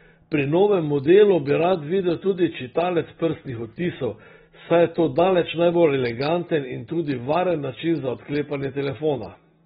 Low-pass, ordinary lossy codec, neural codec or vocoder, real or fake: 19.8 kHz; AAC, 16 kbps; none; real